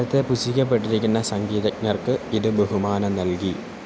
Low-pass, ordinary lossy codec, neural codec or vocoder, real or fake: none; none; none; real